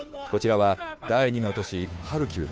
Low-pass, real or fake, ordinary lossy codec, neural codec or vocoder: none; fake; none; codec, 16 kHz, 2 kbps, FunCodec, trained on Chinese and English, 25 frames a second